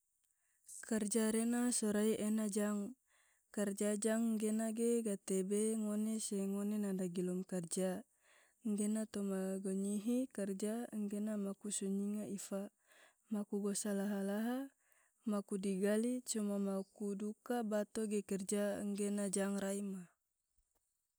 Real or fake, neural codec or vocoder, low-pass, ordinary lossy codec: real; none; none; none